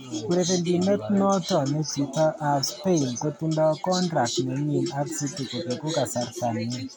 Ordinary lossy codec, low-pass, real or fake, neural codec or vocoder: none; none; real; none